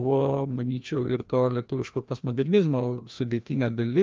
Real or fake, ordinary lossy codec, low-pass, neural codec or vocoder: fake; Opus, 24 kbps; 7.2 kHz; codec, 16 kHz, 1 kbps, FreqCodec, larger model